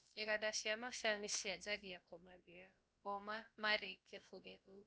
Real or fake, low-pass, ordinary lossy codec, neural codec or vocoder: fake; none; none; codec, 16 kHz, about 1 kbps, DyCAST, with the encoder's durations